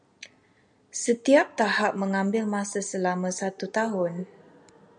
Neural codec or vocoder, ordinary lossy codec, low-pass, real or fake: none; MP3, 96 kbps; 9.9 kHz; real